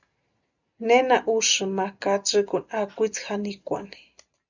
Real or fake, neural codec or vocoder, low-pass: real; none; 7.2 kHz